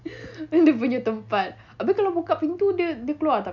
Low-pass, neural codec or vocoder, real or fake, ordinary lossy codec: 7.2 kHz; none; real; none